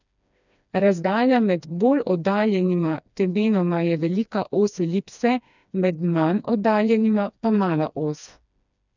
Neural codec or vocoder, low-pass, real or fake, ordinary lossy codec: codec, 16 kHz, 2 kbps, FreqCodec, smaller model; 7.2 kHz; fake; none